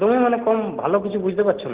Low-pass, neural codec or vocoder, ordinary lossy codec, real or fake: 3.6 kHz; none; Opus, 16 kbps; real